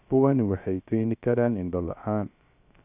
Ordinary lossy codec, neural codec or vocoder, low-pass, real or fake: AAC, 32 kbps; codec, 16 kHz, 0.3 kbps, FocalCodec; 3.6 kHz; fake